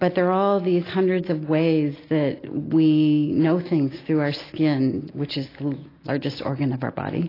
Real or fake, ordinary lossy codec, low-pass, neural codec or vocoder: real; AAC, 24 kbps; 5.4 kHz; none